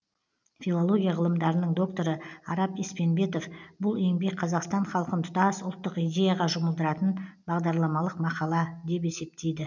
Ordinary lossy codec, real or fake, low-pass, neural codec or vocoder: none; real; 7.2 kHz; none